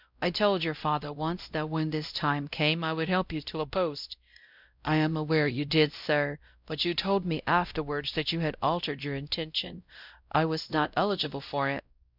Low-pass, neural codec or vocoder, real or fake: 5.4 kHz; codec, 16 kHz, 0.5 kbps, X-Codec, WavLM features, trained on Multilingual LibriSpeech; fake